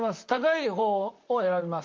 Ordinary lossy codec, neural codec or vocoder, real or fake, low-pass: Opus, 32 kbps; vocoder, 44.1 kHz, 128 mel bands, Pupu-Vocoder; fake; 7.2 kHz